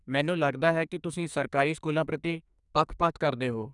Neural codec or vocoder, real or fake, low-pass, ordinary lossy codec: codec, 44.1 kHz, 2.6 kbps, SNAC; fake; 10.8 kHz; none